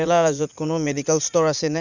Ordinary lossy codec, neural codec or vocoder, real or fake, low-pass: none; vocoder, 44.1 kHz, 80 mel bands, Vocos; fake; 7.2 kHz